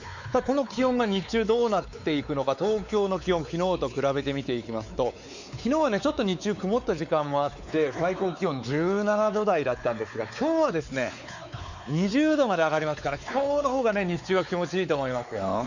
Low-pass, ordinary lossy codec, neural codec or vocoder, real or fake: 7.2 kHz; none; codec, 16 kHz, 4 kbps, X-Codec, WavLM features, trained on Multilingual LibriSpeech; fake